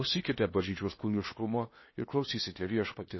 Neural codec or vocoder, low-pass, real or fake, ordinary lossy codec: codec, 16 kHz in and 24 kHz out, 0.8 kbps, FocalCodec, streaming, 65536 codes; 7.2 kHz; fake; MP3, 24 kbps